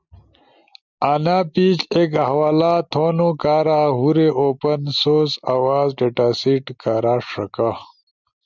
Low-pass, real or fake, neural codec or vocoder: 7.2 kHz; real; none